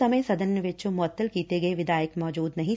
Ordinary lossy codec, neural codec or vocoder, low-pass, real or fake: none; none; none; real